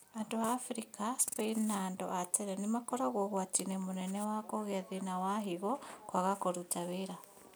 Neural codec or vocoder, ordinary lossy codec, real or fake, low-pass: none; none; real; none